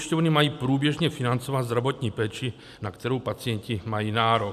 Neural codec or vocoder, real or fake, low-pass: none; real; 14.4 kHz